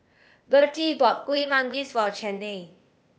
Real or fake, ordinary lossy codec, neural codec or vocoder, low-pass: fake; none; codec, 16 kHz, 0.8 kbps, ZipCodec; none